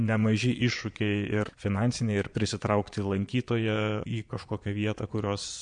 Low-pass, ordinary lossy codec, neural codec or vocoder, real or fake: 9.9 kHz; MP3, 64 kbps; vocoder, 22.05 kHz, 80 mel bands, Vocos; fake